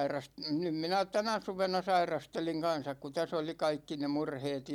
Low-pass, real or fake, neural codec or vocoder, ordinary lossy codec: 19.8 kHz; real; none; none